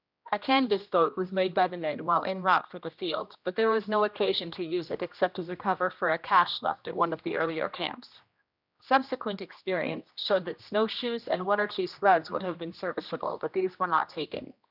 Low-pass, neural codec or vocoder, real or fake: 5.4 kHz; codec, 16 kHz, 1 kbps, X-Codec, HuBERT features, trained on general audio; fake